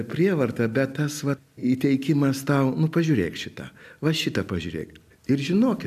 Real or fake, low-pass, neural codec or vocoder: real; 14.4 kHz; none